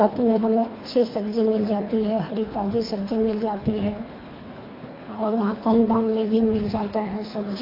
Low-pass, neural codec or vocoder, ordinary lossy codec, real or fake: 5.4 kHz; codec, 24 kHz, 3 kbps, HILCodec; AAC, 24 kbps; fake